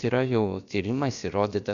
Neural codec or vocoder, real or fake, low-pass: codec, 16 kHz, about 1 kbps, DyCAST, with the encoder's durations; fake; 7.2 kHz